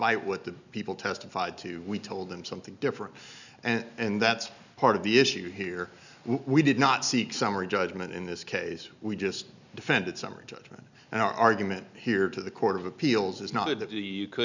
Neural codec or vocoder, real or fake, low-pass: none; real; 7.2 kHz